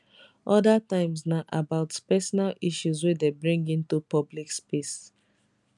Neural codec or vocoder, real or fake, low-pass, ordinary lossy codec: none; real; 10.8 kHz; none